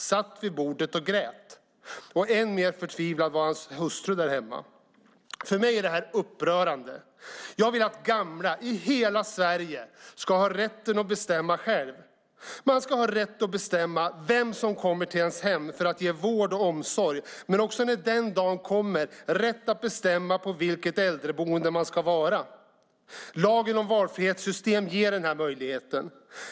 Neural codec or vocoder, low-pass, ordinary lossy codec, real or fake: none; none; none; real